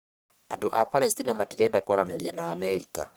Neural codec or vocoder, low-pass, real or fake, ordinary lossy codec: codec, 44.1 kHz, 1.7 kbps, Pupu-Codec; none; fake; none